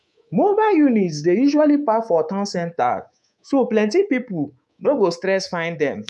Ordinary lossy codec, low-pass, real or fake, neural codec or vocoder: none; none; fake; codec, 24 kHz, 3.1 kbps, DualCodec